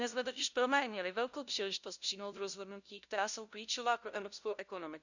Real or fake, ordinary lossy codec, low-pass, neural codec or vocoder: fake; none; 7.2 kHz; codec, 16 kHz, 0.5 kbps, FunCodec, trained on LibriTTS, 25 frames a second